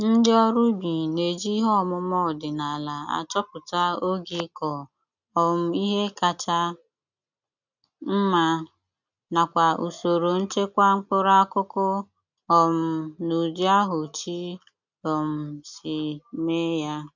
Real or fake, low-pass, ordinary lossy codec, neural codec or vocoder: real; 7.2 kHz; none; none